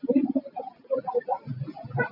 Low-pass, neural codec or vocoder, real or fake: 5.4 kHz; none; real